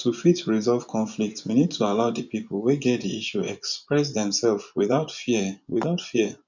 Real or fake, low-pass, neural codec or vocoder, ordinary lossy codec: fake; 7.2 kHz; vocoder, 24 kHz, 100 mel bands, Vocos; none